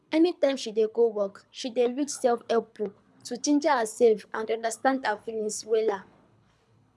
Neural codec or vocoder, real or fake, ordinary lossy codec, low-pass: codec, 24 kHz, 6 kbps, HILCodec; fake; none; none